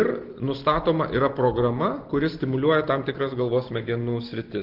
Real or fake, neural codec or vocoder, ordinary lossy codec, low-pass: real; none; Opus, 16 kbps; 5.4 kHz